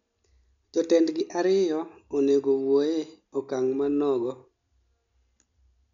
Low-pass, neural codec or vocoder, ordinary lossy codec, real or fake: 7.2 kHz; none; none; real